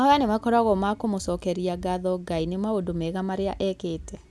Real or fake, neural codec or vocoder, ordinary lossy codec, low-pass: real; none; none; none